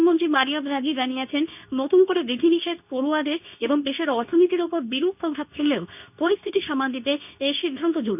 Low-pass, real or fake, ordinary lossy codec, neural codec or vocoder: 3.6 kHz; fake; none; codec, 24 kHz, 0.9 kbps, WavTokenizer, medium speech release version 2